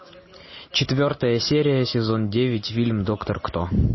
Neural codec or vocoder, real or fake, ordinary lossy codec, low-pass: none; real; MP3, 24 kbps; 7.2 kHz